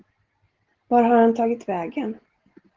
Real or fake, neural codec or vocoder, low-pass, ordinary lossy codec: real; none; 7.2 kHz; Opus, 16 kbps